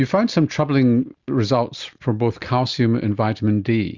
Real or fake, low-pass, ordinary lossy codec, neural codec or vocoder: real; 7.2 kHz; Opus, 64 kbps; none